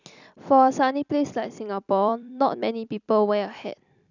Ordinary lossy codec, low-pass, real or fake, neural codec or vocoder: none; 7.2 kHz; fake; vocoder, 44.1 kHz, 128 mel bands every 256 samples, BigVGAN v2